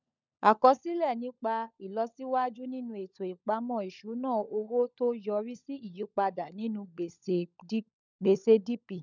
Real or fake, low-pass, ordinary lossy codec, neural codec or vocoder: fake; 7.2 kHz; none; codec, 16 kHz, 16 kbps, FunCodec, trained on LibriTTS, 50 frames a second